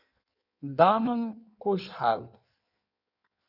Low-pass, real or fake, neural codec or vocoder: 5.4 kHz; fake; codec, 16 kHz in and 24 kHz out, 1.1 kbps, FireRedTTS-2 codec